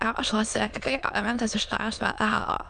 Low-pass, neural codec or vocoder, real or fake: 9.9 kHz; autoencoder, 22.05 kHz, a latent of 192 numbers a frame, VITS, trained on many speakers; fake